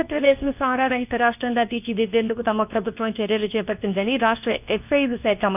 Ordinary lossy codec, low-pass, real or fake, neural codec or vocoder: none; 3.6 kHz; fake; codec, 24 kHz, 0.9 kbps, WavTokenizer, medium speech release version 2